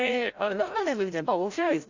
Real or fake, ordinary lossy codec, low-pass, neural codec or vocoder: fake; AAC, 48 kbps; 7.2 kHz; codec, 16 kHz, 0.5 kbps, FreqCodec, larger model